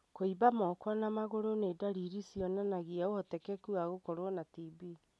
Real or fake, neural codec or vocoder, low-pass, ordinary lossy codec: real; none; none; none